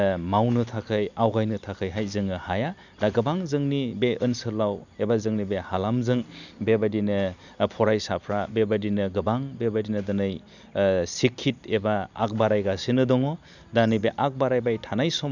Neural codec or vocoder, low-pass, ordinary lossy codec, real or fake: none; 7.2 kHz; none; real